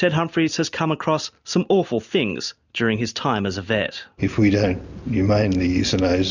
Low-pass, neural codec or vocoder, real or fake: 7.2 kHz; none; real